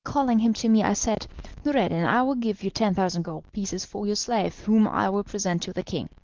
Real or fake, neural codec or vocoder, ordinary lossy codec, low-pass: real; none; Opus, 16 kbps; 7.2 kHz